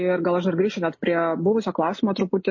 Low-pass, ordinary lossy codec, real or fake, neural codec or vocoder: 7.2 kHz; MP3, 32 kbps; real; none